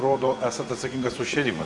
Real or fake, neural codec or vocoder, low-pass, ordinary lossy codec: fake; vocoder, 24 kHz, 100 mel bands, Vocos; 10.8 kHz; Opus, 64 kbps